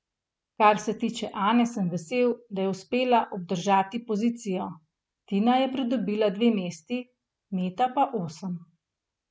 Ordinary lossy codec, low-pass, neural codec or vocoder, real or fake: none; none; none; real